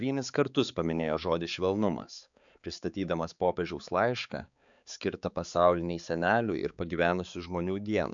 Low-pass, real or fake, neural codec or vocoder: 7.2 kHz; fake; codec, 16 kHz, 4 kbps, X-Codec, HuBERT features, trained on balanced general audio